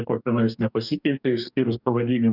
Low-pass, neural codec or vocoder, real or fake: 5.4 kHz; codec, 44.1 kHz, 2.6 kbps, DAC; fake